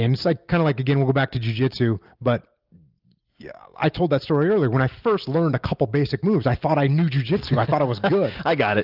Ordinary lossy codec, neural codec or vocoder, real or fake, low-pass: Opus, 24 kbps; none; real; 5.4 kHz